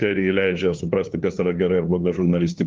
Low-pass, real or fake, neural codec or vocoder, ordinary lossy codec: 7.2 kHz; fake; codec, 16 kHz, 2 kbps, FunCodec, trained on LibriTTS, 25 frames a second; Opus, 32 kbps